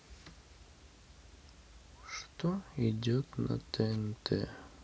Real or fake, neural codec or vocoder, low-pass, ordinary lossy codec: real; none; none; none